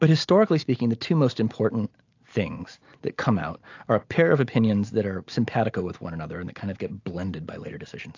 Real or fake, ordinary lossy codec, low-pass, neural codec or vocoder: real; AAC, 48 kbps; 7.2 kHz; none